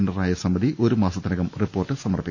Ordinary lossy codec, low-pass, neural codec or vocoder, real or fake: none; 7.2 kHz; none; real